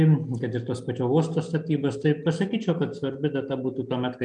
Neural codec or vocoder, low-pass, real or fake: none; 9.9 kHz; real